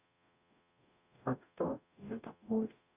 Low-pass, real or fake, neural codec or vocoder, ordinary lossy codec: 3.6 kHz; fake; codec, 44.1 kHz, 0.9 kbps, DAC; none